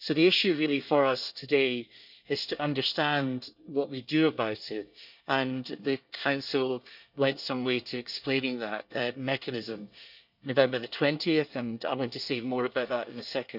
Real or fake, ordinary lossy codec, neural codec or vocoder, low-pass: fake; none; codec, 24 kHz, 1 kbps, SNAC; 5.4 kHz